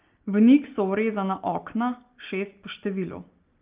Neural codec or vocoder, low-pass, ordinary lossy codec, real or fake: none; 3.6 kHz; Opus, 24 kbps; real